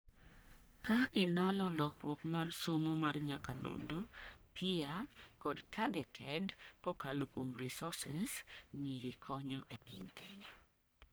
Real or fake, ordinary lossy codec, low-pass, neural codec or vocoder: fake; none; none; codec, 44.1 kHz, 1.7 kbps, Pupu-Codec